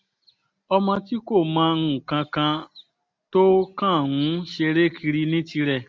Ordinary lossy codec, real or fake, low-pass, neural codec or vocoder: none; real; none; none